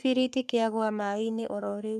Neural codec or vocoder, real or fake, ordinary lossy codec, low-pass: codec, 44.1 kHz, 3.4 kbps, Pupu-Codec; fake; none; 14.4 kHz